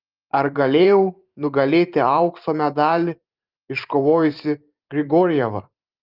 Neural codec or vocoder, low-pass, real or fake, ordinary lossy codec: vocoder, 44.1 kHz, 128 mel bands every 512 samples, BigVGAN v2; 5.4 kHz; fake; Opus, 32 kbps